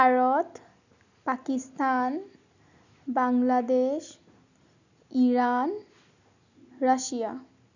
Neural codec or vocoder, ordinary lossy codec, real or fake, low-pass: none; none; real; 7.2 kHz